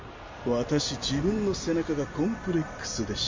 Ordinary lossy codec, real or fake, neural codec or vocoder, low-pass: MP3, 64 kbps; real; none; 7.2 kHz